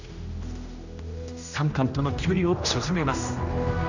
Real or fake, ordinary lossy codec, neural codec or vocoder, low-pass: fake; none; codec, 16 kHz, 1 kbps, X-Codec, HuBERT features, trained on general audio; 7.2 kHz